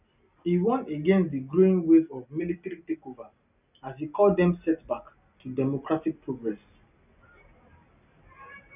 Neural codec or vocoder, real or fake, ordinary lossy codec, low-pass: none; real; none; 3.6 kHz